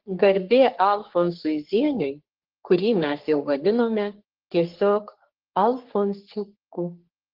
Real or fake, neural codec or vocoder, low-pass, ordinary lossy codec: fake; codec, 44.1 kHz, 3.4 kbps, Pupu-Codec; 5.4 kHz; Opus, 16 kbps